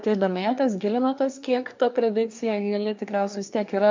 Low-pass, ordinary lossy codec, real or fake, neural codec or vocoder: 7.2 kHz; MP3, 64 kbps; fake; codec, 24 kHz, 1 kbps, SNAC